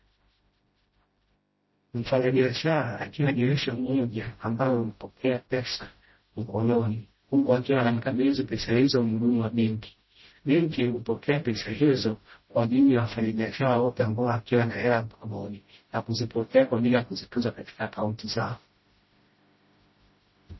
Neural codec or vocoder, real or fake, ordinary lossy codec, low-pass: codec, 16 kHz, 0.5 kbps, FreqCodec, smaller model; fake; MP3, 24 kbps; 7.2 kHz